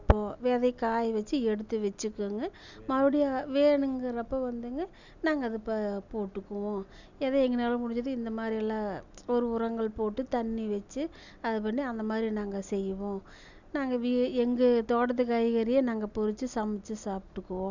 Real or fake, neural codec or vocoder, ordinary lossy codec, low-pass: real; none; none; 7.2 kHz